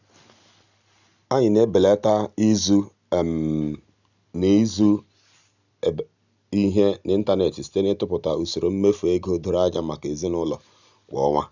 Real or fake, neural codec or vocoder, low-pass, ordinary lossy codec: real; none; 7.2 kHz; none